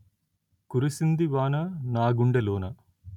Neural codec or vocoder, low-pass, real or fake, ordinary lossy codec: none; 19.8 kHz; real; none